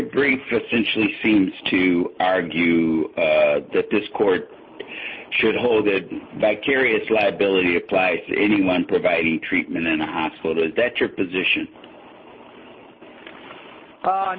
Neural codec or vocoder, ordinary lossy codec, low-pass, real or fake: none; MP3, 24 kbps; 7.2 kHz; real